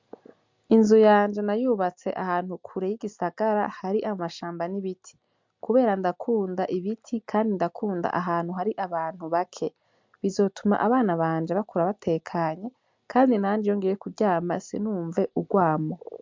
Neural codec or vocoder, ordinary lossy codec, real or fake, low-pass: none; MP3, 64 kbps; real; 7.2 kHz